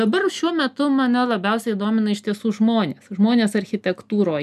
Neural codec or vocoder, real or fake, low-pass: none; real; 14.4 kHz